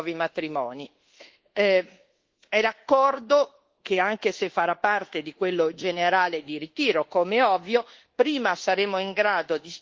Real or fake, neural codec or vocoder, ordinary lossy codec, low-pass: fake; codec, 24 kHz, 1.2 kbps, DualCodec; Opus, 16 kbps; 7.2 kHz